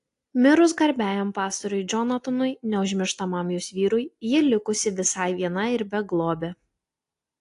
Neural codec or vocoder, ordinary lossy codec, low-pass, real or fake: none; AAC, 48 kbps; 10.8 kHz; real